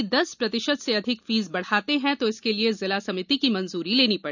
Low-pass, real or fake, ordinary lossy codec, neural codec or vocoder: 7.2 kHz; real; none; none